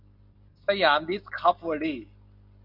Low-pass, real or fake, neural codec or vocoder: 5.4 kHz; real; none